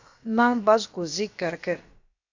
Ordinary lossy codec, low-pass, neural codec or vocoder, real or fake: MP3, 64 kbps; 7.2 kHz; codec, 16 kHz, about 1 kbps, DyCAST, with the encoder's durations; fake